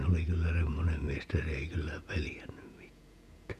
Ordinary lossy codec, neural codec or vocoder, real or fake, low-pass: none; vocoder, 48 kHz, 128 mel bands, Vocos; fake; 14.4 kHz